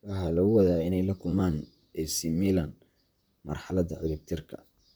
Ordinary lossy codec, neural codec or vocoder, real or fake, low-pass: none; vocoder, 44.1 kHz, 128 mel bands, Pupu-Vocoder; fake; none